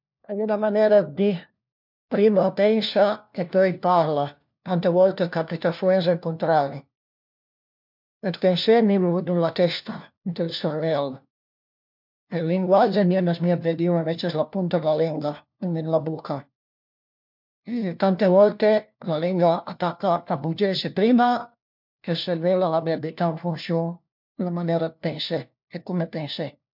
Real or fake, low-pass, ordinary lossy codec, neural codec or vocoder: fake; 5.4 kHz; MP3, 48 kbps; codec, 16 kHz, 1 kbps, FunCodec, trained on LibriTTS, 50 frames a second